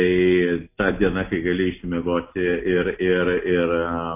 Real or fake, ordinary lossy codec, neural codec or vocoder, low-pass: real; AAC, 24 kbps; none; 3.6 kHz